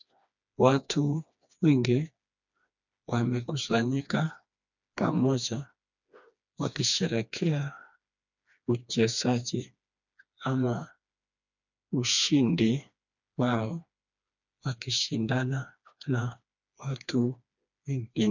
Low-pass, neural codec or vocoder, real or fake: 7.2 kHz; codec, 16 kHz, 2 kbps, FreqCodec, smaller model; fake